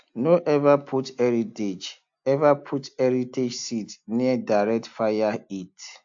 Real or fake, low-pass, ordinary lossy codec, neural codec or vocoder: real; 7.2 kHz; AAC, 64 kbps; none